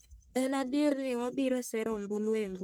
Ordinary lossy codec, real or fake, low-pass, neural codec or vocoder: none; fake; none; codec, 44.1 kHz, 1.7 kbps, Pupu-Codec